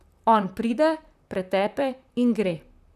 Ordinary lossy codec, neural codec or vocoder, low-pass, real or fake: none; vocoder, 44.1 kHz, 128 mel bands, Pupu-Vocoder; 14.4 kHz; fake